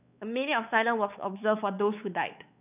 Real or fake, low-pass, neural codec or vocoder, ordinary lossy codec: fake; 3.6 kHz; codec, 16 kHz, 4 kbps, X-Codec, WavLM features, trained on Multilingual LibriSpeech; none